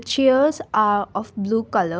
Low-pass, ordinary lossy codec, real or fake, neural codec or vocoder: none; none; real; none